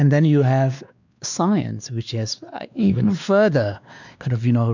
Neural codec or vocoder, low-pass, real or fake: codec, 16 kHz, 2 kbps, X-Codec, WavLM features, trained on Multilingual LibriSpeech; 7.2 kHz; fake